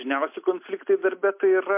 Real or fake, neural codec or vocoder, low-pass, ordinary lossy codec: real; none; 3.6 kHz; MP3, 32 kbps